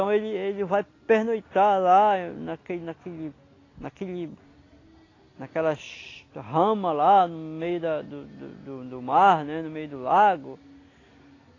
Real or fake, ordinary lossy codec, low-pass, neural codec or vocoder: real; AAC, 32 kbps; 7.2 kHz; none